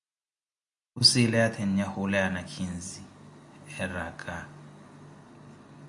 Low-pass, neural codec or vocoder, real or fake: 10.8 kHz; none; real